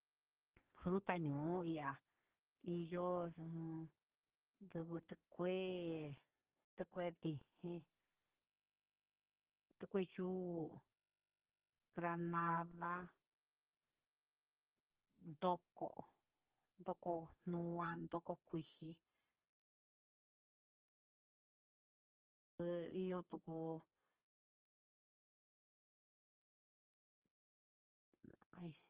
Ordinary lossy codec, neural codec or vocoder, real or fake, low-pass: Opus, 16 kbps; codec, 32 kHz, 1.9 kbps, SNAC; fake; 3.6 kHz